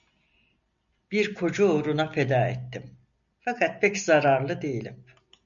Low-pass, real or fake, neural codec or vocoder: 7.2 kHz; real; none